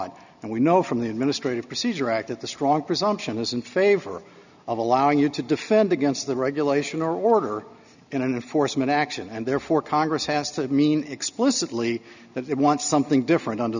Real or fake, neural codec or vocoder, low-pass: real; none; 7.2 kHz